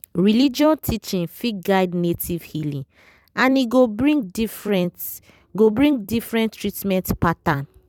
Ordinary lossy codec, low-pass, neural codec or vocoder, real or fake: none; 19.8 kHz; vocoder, 44.1 kHz, 128 mel bands every 256 samples, BigVGAN v2; fake